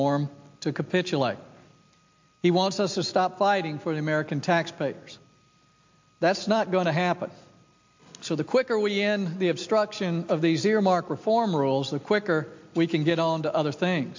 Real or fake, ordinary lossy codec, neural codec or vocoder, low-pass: real; MP3, 48 kbps; none; 7.2 kHz